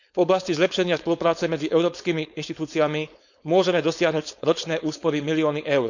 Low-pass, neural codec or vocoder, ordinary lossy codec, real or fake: 7.2 kHz; codec, 16 kHz, 4.8 kbps, FACodec; none; fake